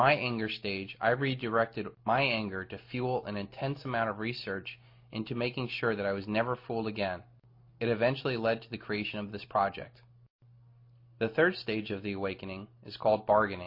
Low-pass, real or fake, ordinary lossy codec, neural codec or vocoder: 5.4 kHz; real; MP3, 32 kbps; none